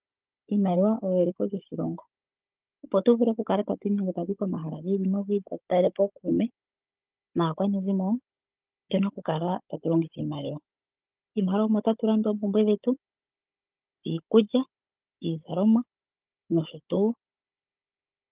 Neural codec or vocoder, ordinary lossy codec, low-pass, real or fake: codec, 16 kHz, 16 kbps, FunCodec, trained on Chinese and English, 50 frames a second; Opus, 32 kbps; 3.6 kHz; fake